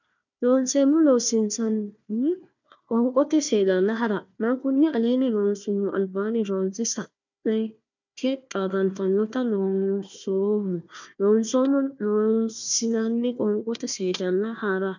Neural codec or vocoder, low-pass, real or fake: codec, 16 kHz, 1 kbps, FunCodec, trained on Chinese and English, 50 frames a second; 7.2 kHz; fake